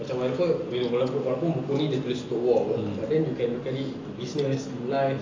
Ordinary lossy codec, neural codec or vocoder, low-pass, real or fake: AAC, 32 kbps; none; 7.2 kHz; real